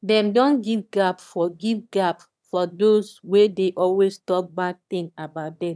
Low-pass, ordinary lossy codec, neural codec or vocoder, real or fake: none; none; autoencoder, 22.05 kHz, a latent of 192 numbers a frame, VITS, trained on one speaker; fake